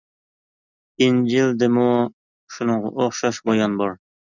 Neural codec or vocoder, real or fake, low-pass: none; real; 7.2 kHz